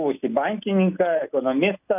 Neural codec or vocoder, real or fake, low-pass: none; real; 3.6 kHz